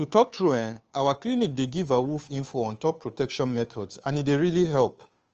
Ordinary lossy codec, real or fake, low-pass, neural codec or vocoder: Opus, 24 kbps; fake; 7.2 kHz; codec, 16 kHz, 2 kbps, FunCodec, trained on Chinese and English, 25 frames a second